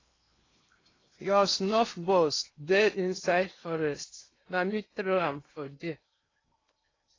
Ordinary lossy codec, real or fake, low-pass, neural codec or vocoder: AAC, 32 kbps; fake; 7.2 kHz; codec, 16 kHz in and 24 kHz out, 0.8 kbps, FocalCodec, streaming, 65536 codes